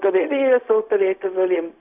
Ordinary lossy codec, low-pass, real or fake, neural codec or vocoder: AAC, 32 kbps; 3.6 kHz; fake; codec, 16 kHz, 0.4 kbps, LongCat-Audio-Codec